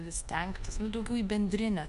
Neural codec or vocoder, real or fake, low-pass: codec, 24 kHz, 1.2 kbps, DualCodec; fake; 10.8 kHz